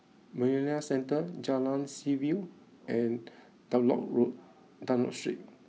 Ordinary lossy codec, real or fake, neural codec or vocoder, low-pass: none; real; none; none